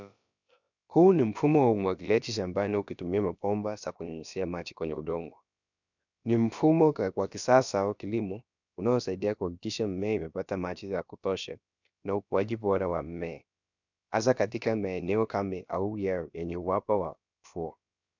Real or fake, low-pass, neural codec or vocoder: fake; 7.2 kHz; codec, 16 kHz, about 1 kbps, DyCAST, with the encoder's durations